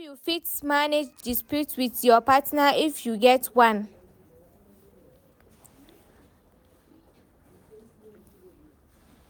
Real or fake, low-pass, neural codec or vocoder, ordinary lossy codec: real; none; none; none